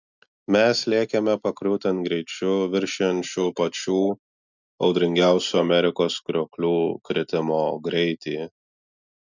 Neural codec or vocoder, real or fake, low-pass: none; real; 7.2 kHz